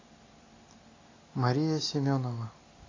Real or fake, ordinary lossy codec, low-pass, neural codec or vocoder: real; AAC, 32 kbps; 7.2 kHz; none